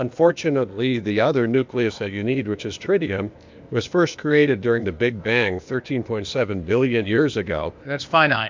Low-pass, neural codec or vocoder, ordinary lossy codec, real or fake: 7.2 kHz; codec, 16 kHz, 0.8 kbps, ZipCodec; MP3, 64 kbps; fake